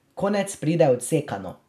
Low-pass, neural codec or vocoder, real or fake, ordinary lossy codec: 14.4 kHz; none; real; none